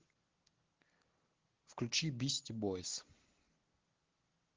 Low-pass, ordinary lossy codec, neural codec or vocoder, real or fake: 7.2 kHz; Opus, 16 kbps; none; real